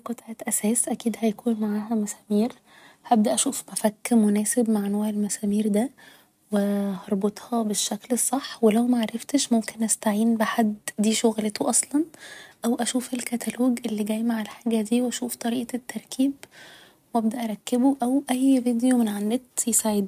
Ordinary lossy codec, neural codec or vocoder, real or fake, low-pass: none; none; real; 14.4 kHz